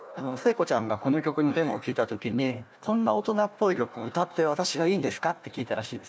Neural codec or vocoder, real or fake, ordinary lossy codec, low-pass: codec, 16 kHz, 1 kbps, FunCodec, trained on Chinese and English, 50 frames a second; fake; none; none